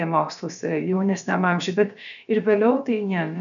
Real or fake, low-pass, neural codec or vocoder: fake; 7.2 kHz; codec, 16 kHz, about 1 kbps, DyCAST, with the encoder's durations